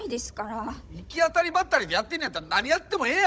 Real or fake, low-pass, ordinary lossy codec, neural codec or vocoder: fake; none; none; codec, 16 kHz, 16 kbps, FunCodec, trained on Chinese and English, 50 frames a second